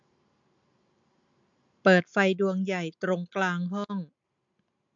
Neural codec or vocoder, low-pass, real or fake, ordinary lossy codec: none; 7.2 kHz; real; MP3, 64 kbps